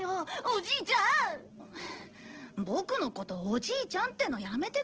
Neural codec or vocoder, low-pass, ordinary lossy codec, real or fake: none; 7.2 kHz; Opus, 16 kbps; real